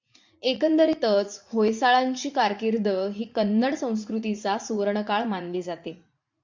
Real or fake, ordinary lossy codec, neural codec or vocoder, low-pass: fake; MP3, 64 kbps; vocoder, 44.1 kHz, 80 mel bands, Vocos; 7.2 kHz